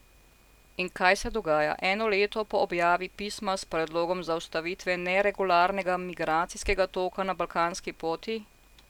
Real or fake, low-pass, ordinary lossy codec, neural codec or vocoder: real; 19.8 kHz; none; none